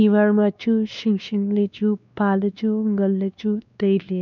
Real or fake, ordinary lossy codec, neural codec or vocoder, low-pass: fake; none; codec, 16 kHz, 2 kbps, X-Codec, WavLM features, trained on Multilingual LibriSpeech; 7.2 kHz